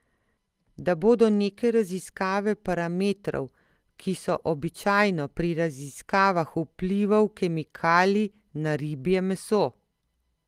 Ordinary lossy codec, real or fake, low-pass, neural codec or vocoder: Opus, 24 kbps; real; 14.4 kHz; none